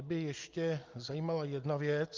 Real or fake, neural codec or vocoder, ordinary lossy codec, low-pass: real; none; Opus, 32 kbps; 7.2 kHz